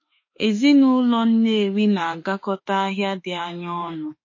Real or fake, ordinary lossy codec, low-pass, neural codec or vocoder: fake; MP3, 32 kbps; 7.2 kHz; autoencoder, 48 kHz, 32 numbers a frame, DAC-VAE, trained on Japanese speech